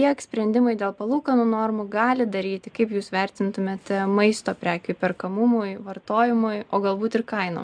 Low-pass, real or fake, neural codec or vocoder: 9.9 kHz; real; none